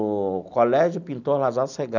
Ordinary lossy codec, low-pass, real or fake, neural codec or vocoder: none; 7.2 kHz; real; none